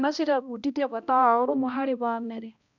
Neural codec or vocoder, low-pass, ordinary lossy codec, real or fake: codec, 16 kHz, 1 kbps, X-Codec, HuBERT features, trained on balanced general audio; 7.2 kHz; none; fake